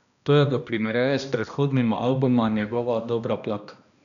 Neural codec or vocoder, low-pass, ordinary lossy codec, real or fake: codec, 16 kHz, 1 kbps, X-Codec, HuBERT features, trained on balanced general audio; 7.2 kHz; none; fake